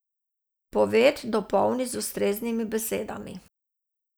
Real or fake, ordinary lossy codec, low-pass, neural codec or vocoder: real; none; none; none